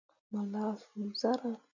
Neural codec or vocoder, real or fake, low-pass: none; real; 7.2 kHz